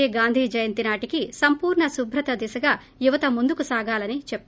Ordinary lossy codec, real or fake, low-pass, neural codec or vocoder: none; real; none; none